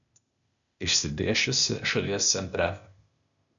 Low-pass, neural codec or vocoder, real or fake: 7.2 kHz; codec, 16 kHz, 0.8 kbps, ZipCodec; fake